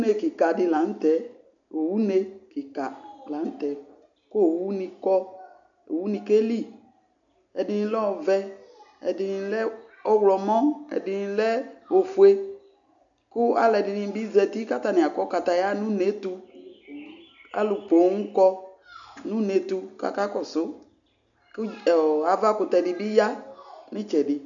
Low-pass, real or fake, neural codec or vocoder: 7.2 kHz; real; none